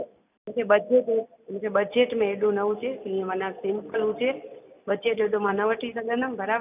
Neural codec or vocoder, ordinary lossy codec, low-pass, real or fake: none; none; 3.6 kHz; real